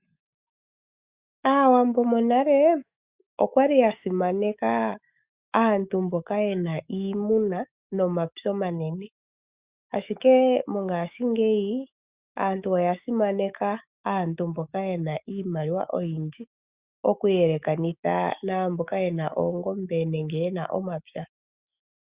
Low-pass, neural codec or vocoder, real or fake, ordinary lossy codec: 3.6 kHz; autoencoder, 48 kHz, 128 numbers a frame, DAC-VAE, trained on Japanese speech; fake; Opus, 64 kbps